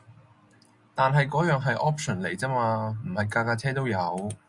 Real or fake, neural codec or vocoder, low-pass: real; none; 10.8 kHz